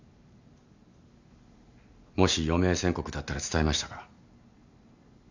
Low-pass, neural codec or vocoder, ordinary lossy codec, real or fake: 7.2 kHz; none; none; real